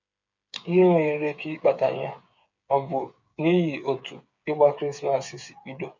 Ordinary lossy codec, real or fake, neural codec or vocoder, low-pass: none; fake; codec, 16 kHz, 8 kbps, FreqCodec, smaller model; 7.2 kHz